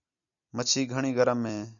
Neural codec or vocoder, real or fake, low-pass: none; real; 7.2 kHz